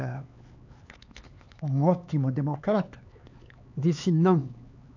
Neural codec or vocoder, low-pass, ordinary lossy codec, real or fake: codec, 16 kHz, 4 kbps, X-Codec, HuBERT features, trained on LibriSpeech; 7.2 kHz; AAC, 48 kbps; fake